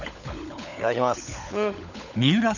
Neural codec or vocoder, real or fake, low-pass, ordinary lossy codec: codec, 16 kHz, 16 kbps, FunCodec, trained on LibriTTS, 50 frames a second; fake; 7.2 kHz; none